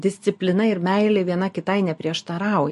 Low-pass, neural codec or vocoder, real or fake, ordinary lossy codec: 14.4 kHz; none; real; MP3, 48 kbps